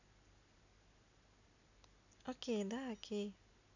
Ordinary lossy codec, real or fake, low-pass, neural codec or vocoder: none; real; 7.2 kHz; none